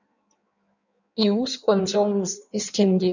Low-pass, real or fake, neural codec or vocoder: 7.2 kHz; fake; codec, 16 kHz in and 24 kHz out, 1.1 kbps, FireRedTTS-2 codec